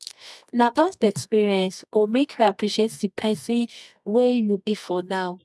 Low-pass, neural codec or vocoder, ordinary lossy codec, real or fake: none; codec, 24 kHz, 0.9 kbps, WavTokenizer, medium music audio release; none; fake